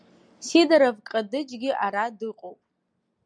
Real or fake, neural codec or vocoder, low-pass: real; none; 9.9 kHz